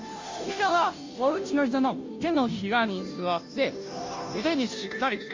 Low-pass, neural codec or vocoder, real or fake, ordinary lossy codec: 7.2 kHz; codec, 16 kHz, 0.5 kbps, FunCodec, trained on Chinese and English, 25 frames a second; fake; MP3, 48 kbps